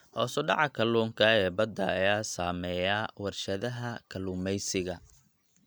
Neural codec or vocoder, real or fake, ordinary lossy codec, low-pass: none; real; none; none